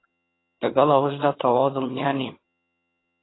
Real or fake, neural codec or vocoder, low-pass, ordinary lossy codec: fake; vocoder, 22.05 kHz, 80 mel bands, HiFi-GAN; 7.2 kHz; AAC, 16 kbps